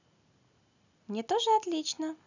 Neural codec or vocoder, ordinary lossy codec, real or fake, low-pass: none; none; real; 7.2 kHz